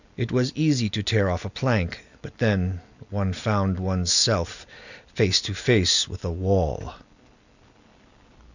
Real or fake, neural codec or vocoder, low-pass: real; none; 7.2 kHz